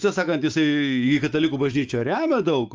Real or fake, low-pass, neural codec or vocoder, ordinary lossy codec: fake; 7.2 kHz; autoencoder, 48 kHz, 128 numbers a frame, DAC-VAE, trained on Japanese speech; Opus, 24 kbps